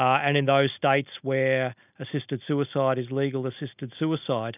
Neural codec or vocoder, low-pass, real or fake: none; 3.6 kHz; real